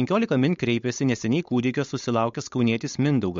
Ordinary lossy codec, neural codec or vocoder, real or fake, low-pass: MP3, 48 kbps; codec, 16 kHz, 4.8 kbps, FACodec; fake; 7.2 kHz